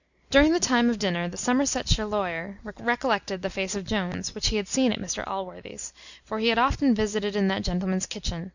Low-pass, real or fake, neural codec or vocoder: 7.2 kHz; real; none